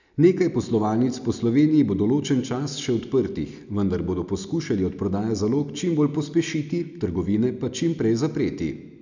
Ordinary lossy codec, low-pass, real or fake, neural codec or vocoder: none; 7.2 kHz; real; none